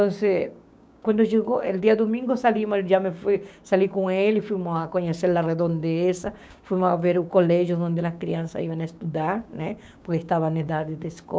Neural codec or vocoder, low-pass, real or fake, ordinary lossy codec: codec, 16 kHz, 6 kbps, DAC; none; fake; none